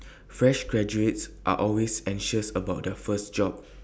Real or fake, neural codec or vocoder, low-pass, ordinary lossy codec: real; none; none; none